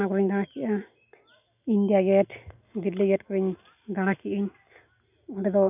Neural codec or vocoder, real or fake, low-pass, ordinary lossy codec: none; real; 3.6 kHz; none